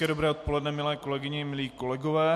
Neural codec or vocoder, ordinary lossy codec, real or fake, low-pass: none; MP3, 64 kbps; real; 14.4 kHz